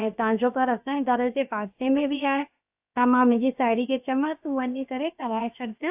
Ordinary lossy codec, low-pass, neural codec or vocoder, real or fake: AAC, 32 kbps; 3.6 kHz; codec, 16 kHz, about 1 kbps, DyCAST, with the encoder's durations; fake